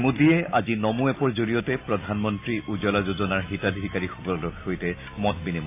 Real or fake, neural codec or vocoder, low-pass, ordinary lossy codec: real; none; 3.6 kHz; AAC, 24 kbps